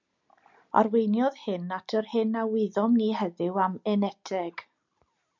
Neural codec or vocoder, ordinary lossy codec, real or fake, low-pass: none; MP3, 64 kbps; real; 7.2 kHz